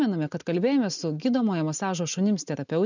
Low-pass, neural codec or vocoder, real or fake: 7.2 kHz; none; real